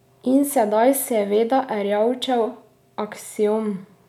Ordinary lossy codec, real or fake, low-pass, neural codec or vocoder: none; real; 19.8 kHz; none